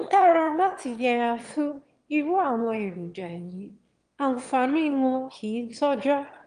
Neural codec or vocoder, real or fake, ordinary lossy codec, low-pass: autoencoder, 22.05 kHz, a latent of 192 numbers a frame, VITS, trained on one speaker; fake; Opus, 24 kbps; 9.9 kHz